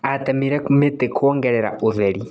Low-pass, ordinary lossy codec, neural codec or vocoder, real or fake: none; none; none; real